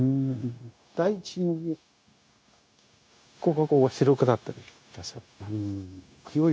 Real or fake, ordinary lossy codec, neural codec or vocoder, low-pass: fake; none; codec, 16 kHz, 0.9 kbps, LongCat-Audio-Codec; none